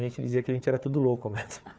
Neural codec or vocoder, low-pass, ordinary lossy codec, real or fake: codec, 16 kHz, 4 kbps, FunCodec, trained on Chinese and English, 50 frames a second; none; none; fake